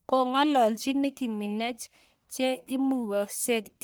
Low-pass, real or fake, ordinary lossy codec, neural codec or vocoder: none; fake; none; codec, 44.1 kHz, 1.7 kbps, Pupu-Codec